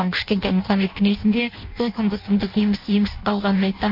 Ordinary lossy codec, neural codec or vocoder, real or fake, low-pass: MP3, 32 kbps; codec, 16 kHz in and 24 kHz out, 0.6 kbps, FireRedTTS-2 codec; fake; 5.4 kHz